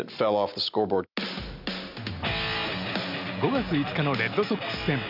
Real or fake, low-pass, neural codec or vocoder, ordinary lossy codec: real; 5.4 kHz; none; none